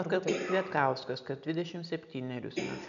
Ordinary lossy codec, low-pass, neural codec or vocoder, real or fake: MP3, 96 kbps; 7.2 kHz; none; real